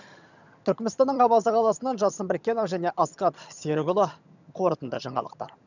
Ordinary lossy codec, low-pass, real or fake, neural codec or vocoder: none; 7.2 kHz; fake; vocoder, 22.05 kHz, 80 mel bands, HiFi-GAN